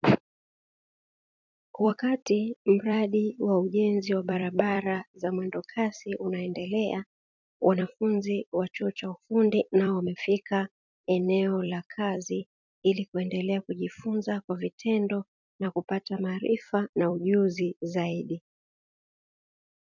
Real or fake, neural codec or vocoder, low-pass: fake; vocoder, 44.1 kHz, 128 mel bands every 256 samples, BigVGAN v2; 7.2 kHz